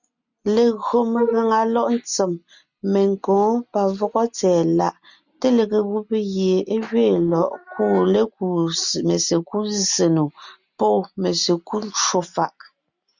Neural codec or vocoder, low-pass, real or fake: none; 7.2 kHz; real